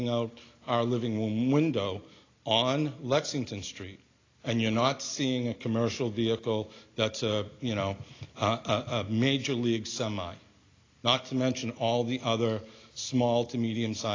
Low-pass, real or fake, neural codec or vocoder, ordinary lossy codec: 7.2 kHz; real; none; AAC, 32 kbps